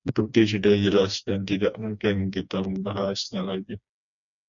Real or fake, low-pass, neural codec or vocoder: fake; 7.2 kHz; codec, 16 kHz, 2 kbps, FreqCodec, smaller model